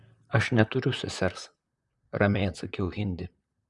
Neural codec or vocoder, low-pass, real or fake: vocoder, 44.1 kHz, 128 mel bands, Pupu-Vocoder; 10.8 kHz; fake